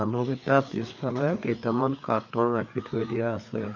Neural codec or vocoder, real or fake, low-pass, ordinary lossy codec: codec, 16 kHz, 4 kbps, FreqCodec, larger model; fake; 7.2 kHz; none